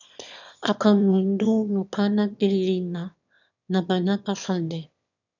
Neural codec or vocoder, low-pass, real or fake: autoencoder, 22.05 kHz, a latent of 192 numbers a frame, VITS, trained on one speaker; 7.2 kHz; fake